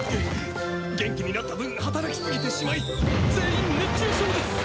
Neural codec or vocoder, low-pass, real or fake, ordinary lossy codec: none; none; real; none